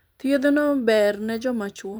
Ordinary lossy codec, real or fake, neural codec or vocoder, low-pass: none; fake; vocoder, 44.1 kHz, 128 mel bands every 256 samples, BigVGAN v2; none